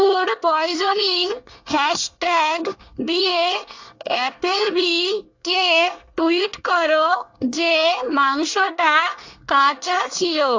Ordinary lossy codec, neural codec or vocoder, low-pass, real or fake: AAC, 48 kbps; codec, 24 kHz, 1 kbps, SNAC; 7.2 kHz; fake